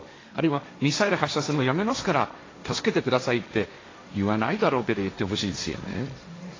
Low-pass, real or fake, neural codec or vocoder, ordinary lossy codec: 7.2 kHz; fake; codec, 16 kHz, 1.1 kbps, Voila-Tokenizer; AAC, 32 kbps